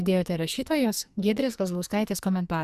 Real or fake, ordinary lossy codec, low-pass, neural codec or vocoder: fake; Opus, 64 kbps; 14.4 kHz; codec, 44.1 kHz, 2.6 kbps, SNAC